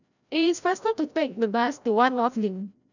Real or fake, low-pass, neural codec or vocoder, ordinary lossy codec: fake; 7.2 kHz; codec, 16 kHz, 0.5 kbps, FreqCodec, larger model; none